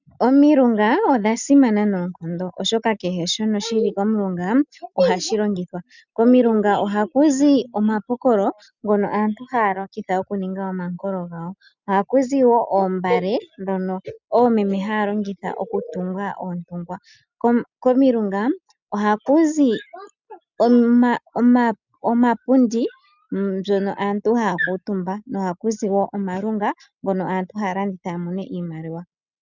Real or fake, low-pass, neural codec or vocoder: real; 7.2 kHz; none